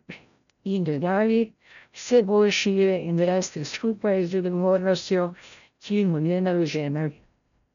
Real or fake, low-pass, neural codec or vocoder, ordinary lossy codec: fake; 7.2 kHz; codec, 16 kHz, 0.5 kbps, FreqCodec, larger model; none